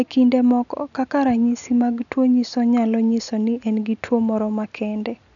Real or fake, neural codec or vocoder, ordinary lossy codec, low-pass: real; none; none; 7.2 kHz